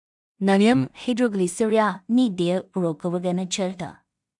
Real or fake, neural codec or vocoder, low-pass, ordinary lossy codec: fake; codec, 16 kHz in and 24 kHz out, 0.4 kbps, LongCat-Audio-Codec, two codebook decoder; 10.8 kHz; none